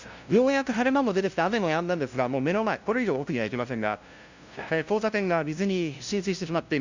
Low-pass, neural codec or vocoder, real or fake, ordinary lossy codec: 7.2 kHz; codec, 16 kHz, 0.5 kbps, FunCodec, trained on LibriTTS, 25 frames a second; fake; Opus, 64 kbps